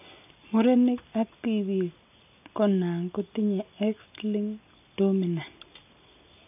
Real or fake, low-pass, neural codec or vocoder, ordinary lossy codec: real; 3.6 kHz; none; none